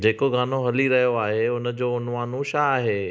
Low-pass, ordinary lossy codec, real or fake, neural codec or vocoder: none; none; real; none